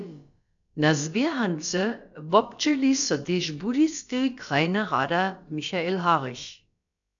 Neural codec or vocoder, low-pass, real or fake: codec, 16 kHz, about 1 kbps, DyCAST, with the encoder's durations; 7.2 kHz; fake